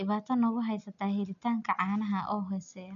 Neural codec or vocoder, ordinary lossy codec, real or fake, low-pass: none; none; real; 7.2 kHz